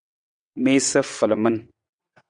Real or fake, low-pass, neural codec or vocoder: fake; 9.9 kHz; vocoder, 22.05 kHz, 80 mel bands, WaveNeXt